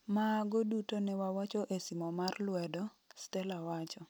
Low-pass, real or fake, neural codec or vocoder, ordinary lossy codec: none; real; none; none